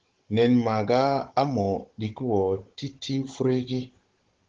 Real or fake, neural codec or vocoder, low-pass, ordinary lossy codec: fake; codec, 16 kHz, 16 kbps, FunCodec, trained on Chinese and English, 50 frames a second; 7.2 kHz; Opus, 16 kbps